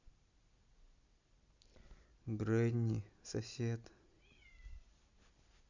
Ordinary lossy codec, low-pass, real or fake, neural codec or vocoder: Opus, 64 kbps; 7.2 kHz; real; none